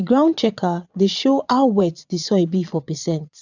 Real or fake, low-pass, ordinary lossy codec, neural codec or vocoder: fake; 7.2 kHz; none; vocoder, 22.05 kHz, 80 mel bands, Vocos